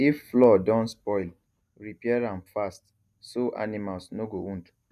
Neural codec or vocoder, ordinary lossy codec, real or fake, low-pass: none; none; real; 14.4 kHz